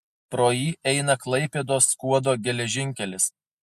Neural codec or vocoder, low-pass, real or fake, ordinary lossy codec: none; 14.4 kHz; real; MP3, 64 kbps